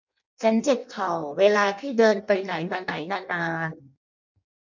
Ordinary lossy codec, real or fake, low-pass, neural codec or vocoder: none; fake; 7.2 kHz; codec, 16 kHz in and 24 kHz out, 0.6 kbps, FireRedTTS-2 codec